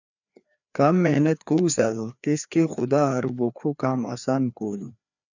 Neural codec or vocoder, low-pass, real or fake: codec, 16 kHz, 2 kbps, FreqCodec, larger model; 7.2 kHz; fake